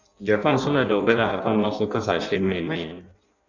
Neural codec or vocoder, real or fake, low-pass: codec, 16 kHz in and 24 kHz out, 0.6 kbps, FireRedTTS-2 codec; fake; 7.2 kHz